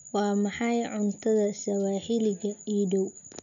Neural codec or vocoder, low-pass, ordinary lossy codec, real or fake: none; 7.2 kHz; none; real